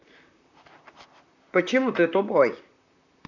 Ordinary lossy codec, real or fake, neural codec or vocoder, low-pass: none; fake; vocoder, 44.1 kHz, 128 mel bands, Pupu-Vocoder; 7.2 kHz